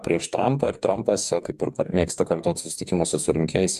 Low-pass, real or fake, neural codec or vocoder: 14.4 kHz; fake; codec, 44.1 kHz, 2.6 kbps, DAC